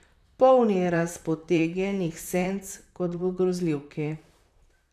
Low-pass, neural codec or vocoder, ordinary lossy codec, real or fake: 14.4 kHz; vocoder, 44.1 kHz, 128 mel bands, Pupu-Vocoder; none; fake